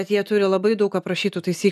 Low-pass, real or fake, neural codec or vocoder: 14.4 kHz; real; none